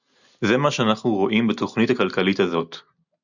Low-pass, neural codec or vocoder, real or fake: 7.2 kHz; none; real